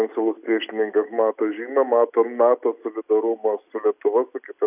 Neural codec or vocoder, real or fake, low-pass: none; real; 3.6 kHz